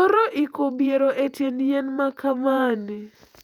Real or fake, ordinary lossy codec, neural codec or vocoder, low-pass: fake; none; vocoder, 48 kHz, 128 mel bands, Vocos; 19.8 kHz